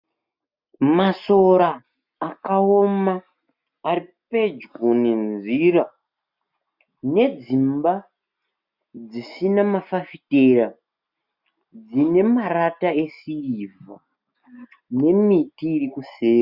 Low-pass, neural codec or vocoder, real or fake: 5.4 kHz; none; real